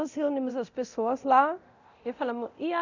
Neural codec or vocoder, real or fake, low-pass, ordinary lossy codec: codec, 24 kHz, 0.9 kbps, DualCodec; fake; 7.2 kHz; none